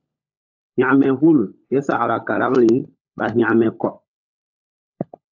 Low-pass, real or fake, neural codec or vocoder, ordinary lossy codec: 7.2 kHz; fake; codec, 16 kHz, 16 kbps, FunCodec, trained on LibriTTS, 50 frames a second; AAC, 48 kbps